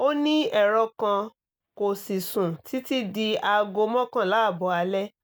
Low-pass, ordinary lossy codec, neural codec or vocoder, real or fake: none; none; none; real